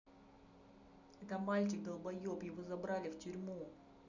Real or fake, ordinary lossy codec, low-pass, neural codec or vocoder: real; none; 7.2 kHz; none